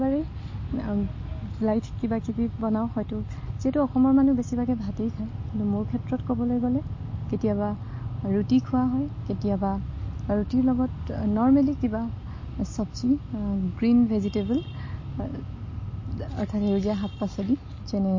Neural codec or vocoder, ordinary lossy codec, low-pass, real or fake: none; MP3, 32 kbps; 7.2 kHz; real